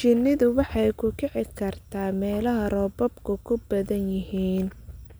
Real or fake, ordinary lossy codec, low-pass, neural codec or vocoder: real; none; none; none